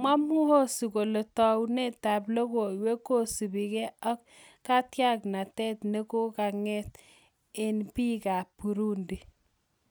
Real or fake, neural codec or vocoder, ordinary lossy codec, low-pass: real; none; none; none